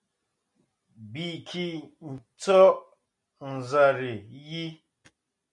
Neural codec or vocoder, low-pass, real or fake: none; 10.8 kHz; real